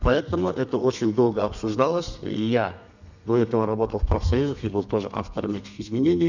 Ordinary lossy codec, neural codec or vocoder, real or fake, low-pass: none; codec, 32 kHz, 1.9 kbps, SNAC; fake; 7.2 kHz